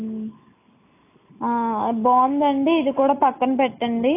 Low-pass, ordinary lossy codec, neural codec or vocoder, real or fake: 3.6 kHz; AAC, 24 kbps; none; real